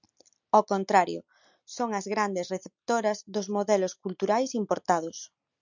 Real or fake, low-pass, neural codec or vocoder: real; 7.2 kHz; none